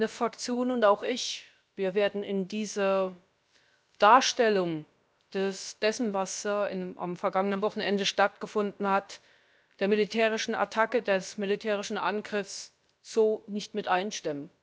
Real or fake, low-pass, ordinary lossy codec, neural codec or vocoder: fake; none; none; codec, 16 kHz, about 1 kbps, DyCAST, with the encoder's durations